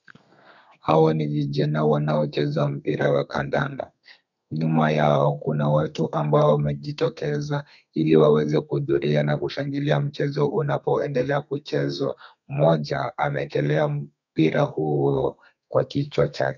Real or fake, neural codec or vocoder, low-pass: fake; codec, 32 kHz, 1.9 kbps, SNAC; 7.2 kHz